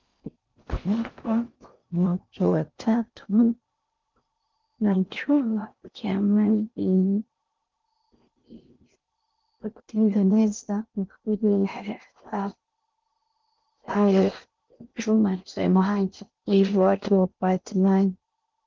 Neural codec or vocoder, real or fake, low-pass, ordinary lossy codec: codec, 16 kHz in and 24 kHz out, 0.6 kbps, FocalCodec, streaming, 4096 codes; fake; 7.2 kHz; Opus, 32 kbps